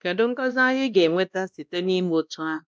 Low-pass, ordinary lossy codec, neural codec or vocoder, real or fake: none; none; codec, 16 kHz, 1 kbps, X-Codec, WavLM features, trained on Multilingual LibriSpeech; fake